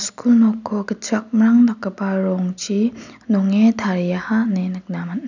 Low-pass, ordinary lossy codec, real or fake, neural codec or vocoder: 7.2 kHz; none; real; none